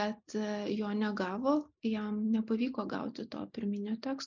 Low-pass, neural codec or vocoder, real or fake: 7.2 kHz; none; real